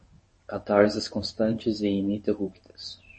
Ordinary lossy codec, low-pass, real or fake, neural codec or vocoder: MP3, 32 kbps; 9.9 kHz; fake; codec, 24 kHz, 0.9 kbps, WavTokenizer, medium speech release version 1